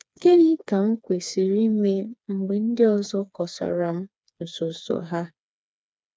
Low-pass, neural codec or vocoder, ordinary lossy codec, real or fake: none; codec, 16 kHz, 4 kbps, FreqCodec, smaller model; none; fake